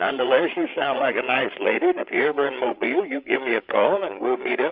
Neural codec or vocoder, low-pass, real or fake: codec, 16 kHz, 8 kbps, FreqCodec, larger model; 5.4 kHz; fake